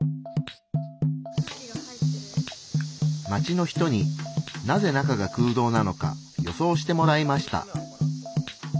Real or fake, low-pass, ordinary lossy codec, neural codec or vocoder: real; none; none; none